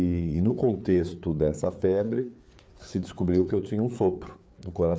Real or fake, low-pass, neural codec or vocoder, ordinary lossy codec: fake; none; codec, 16 kHz, 4 kbps, FreqCodec, larger model; none